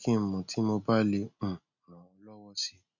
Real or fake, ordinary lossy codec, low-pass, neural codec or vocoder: real; none; 7.2 kHz; none